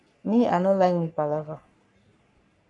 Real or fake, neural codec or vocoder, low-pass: fake; codec, 44.1 kHz, 3.4 kbps, Pupu-Codec; 10.8 kHz